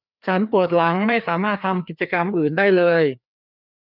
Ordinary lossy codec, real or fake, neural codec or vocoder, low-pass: none; fake; codec, 16 kHz, 2 kbps, FreqCodec, larger model; 5.4 kHz